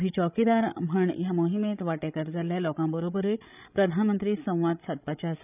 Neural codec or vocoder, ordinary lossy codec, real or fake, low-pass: codec, 16 kHz, 16 kbps, FreqCodec, larger model; none; fake; 3.6 kHz